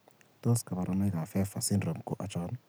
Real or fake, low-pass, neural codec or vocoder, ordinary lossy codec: real; none; none; none